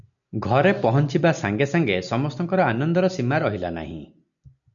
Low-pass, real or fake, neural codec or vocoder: 7.2 kHz; real; none